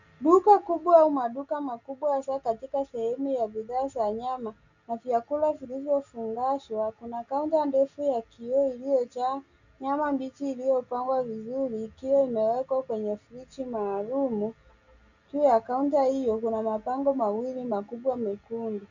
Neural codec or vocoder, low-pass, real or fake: none; 7.2 kHz; real